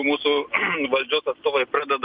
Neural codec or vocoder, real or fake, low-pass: none; real; 5.4 kHz